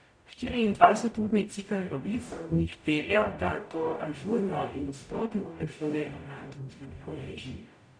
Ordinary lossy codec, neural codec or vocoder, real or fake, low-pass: none; codec, 44.1 kHz, 0.9 kbps, DAC; fake; 9.9 kHz